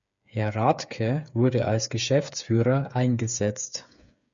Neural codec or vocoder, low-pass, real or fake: codec, 16 kHz, 8 kbps, FreqCodec, smaller model; 7.2 kHz; fake